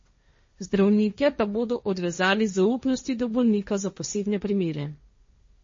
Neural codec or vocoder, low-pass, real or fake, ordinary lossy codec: codec, 16 kHz, 1.1 kbps, Voila-Tokenizer; 7.2 kHz; fake; MP3, 32 kbps